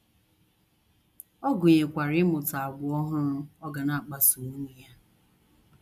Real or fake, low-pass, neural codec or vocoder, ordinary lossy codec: real; 14.4 kHz; none; none